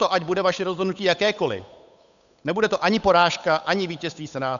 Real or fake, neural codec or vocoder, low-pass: fake; codec, 16 kHz, 8 kbps, FunCodec, trained on Chinese and English, 25 frames a second; 7.2 kHz